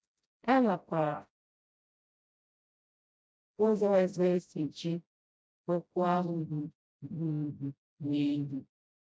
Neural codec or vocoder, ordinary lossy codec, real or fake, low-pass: codec, 16 kHz, 0.5 kbps, FreqCodec, smaller model; none; fake; none